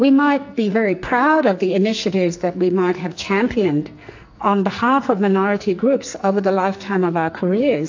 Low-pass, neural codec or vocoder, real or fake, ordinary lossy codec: 7.2 kHz; codec, 44.1 kHz, 2.6 kbps, SNAC; fake; AAC, 48 kbps